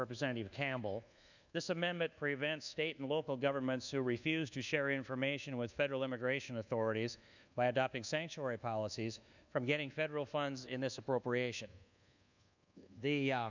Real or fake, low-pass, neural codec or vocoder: fake; 7.2 kHz; codec, 24 kHz, 1.2 kbps, DualCodec